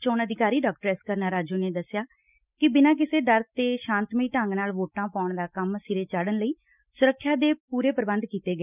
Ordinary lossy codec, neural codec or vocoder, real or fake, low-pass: none; none; real; 3.6 kHz